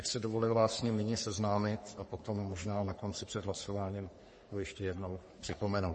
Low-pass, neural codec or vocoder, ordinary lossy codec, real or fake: 10.8 kHz; codec, 44.1 kHz, 3.4 kbps, Pupu-Codec; MP3, 32 kbps; fake